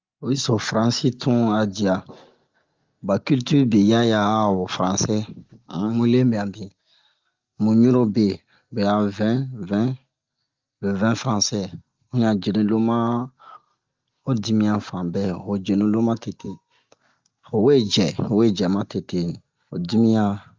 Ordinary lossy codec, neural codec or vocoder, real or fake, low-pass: Opus, 24 kbps; none; real; 7.2 kHz